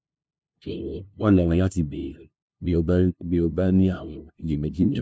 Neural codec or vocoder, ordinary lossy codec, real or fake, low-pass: codec, 16 kHz, 0.5 kbps, FunCodec, trained on LibriTTS, 25 frames a second; none; fake; none